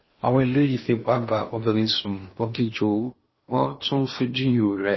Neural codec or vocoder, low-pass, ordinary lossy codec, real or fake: codec, 16 kHz in and 24 kHz out, 0.6 kbps, FocalCodec, streaming, 4096 codes; 7.2 kHz; MP3, 24 kbps; fake